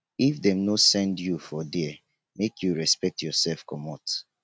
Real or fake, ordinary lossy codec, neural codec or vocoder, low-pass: real; none; none; none